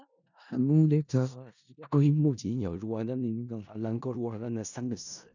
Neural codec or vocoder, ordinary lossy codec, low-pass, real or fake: codec, 16 kHz in and 24 kHz out, 0.4 kbps, LongCat-Audio-Codec, four codebook decoder; none; 7.2 kHz; fake